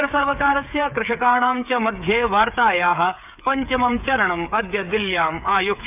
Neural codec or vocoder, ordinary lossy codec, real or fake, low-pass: codec, 16 kHz, 8 kbps, FreqCodec, smaller model; none; fake; 3.6 kHz